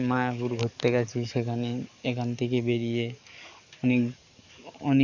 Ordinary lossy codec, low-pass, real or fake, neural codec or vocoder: none; 7.2 kHz; fake; codec, 44.1 kHz, 7.8 kbps, DAC